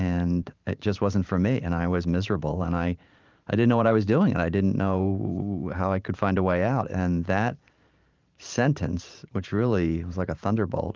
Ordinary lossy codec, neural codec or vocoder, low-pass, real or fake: Opus, 24 kbps; vocoder, 44.1 kHz, 128 mel bands every 512 samples, BigVGAN v2; 7.2 kHz; fake